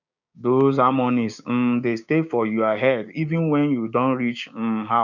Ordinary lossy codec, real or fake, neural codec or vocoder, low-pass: none; fake; codec, 16 kHz, 6 kbps, DAC; 7.2 kHz